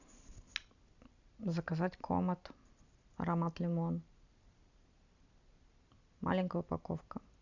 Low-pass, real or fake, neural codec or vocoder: 7.2 kHz; real; none